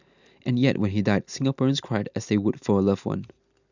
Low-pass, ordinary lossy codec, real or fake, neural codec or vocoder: 7.2 kHz; none; real; none